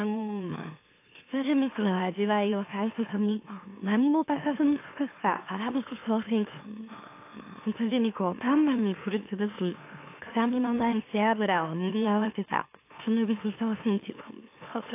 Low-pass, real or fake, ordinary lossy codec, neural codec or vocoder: 3.6 kHz; fake; AAC, 24 kbps; autoencoder, 44.1 kHz, a latent of 192 numbers a frame, MeloTTS